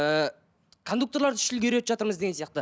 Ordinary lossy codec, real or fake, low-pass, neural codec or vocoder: none; real; none; none